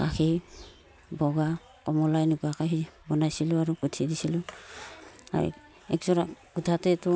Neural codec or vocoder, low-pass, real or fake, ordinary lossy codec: none; none; real; none